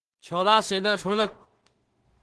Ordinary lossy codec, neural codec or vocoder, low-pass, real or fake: Opus, 16 kbps; codec, 16 kHz in and 24 kHz out, 0.4 kbps, LongCat-Audio-Codec, two codebook decoder; 10.8 kHz; fake